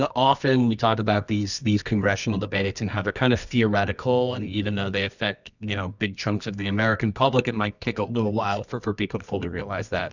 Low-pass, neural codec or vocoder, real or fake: 7.2 kHz; codec, 24 kHz, 0.9 kbps, WavTokenizer, medium music audio release; fake